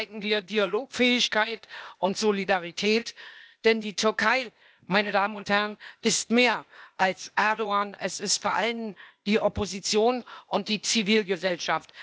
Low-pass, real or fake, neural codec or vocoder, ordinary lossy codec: none; fake; codec, 16 kHz, 0.8 kbps, ZipCodec; none